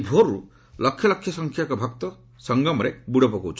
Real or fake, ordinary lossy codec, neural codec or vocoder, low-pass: real; none; none; none